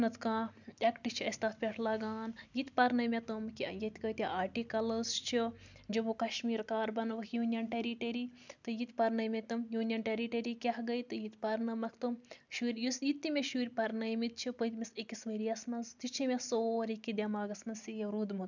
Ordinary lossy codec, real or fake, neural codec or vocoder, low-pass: none; real; none; 7.2 kHz